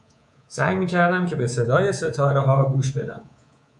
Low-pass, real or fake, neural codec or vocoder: 10.8 kHz; fake; codec, 24 kHz, 3.1 kbps, DualCodec